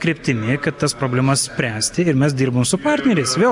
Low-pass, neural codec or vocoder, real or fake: 10.8 kHz; none; real